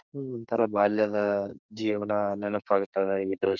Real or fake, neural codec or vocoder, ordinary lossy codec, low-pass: fake; codec, 32 kHz, 1.9 kbps, SNAC; none; 7.2 kHz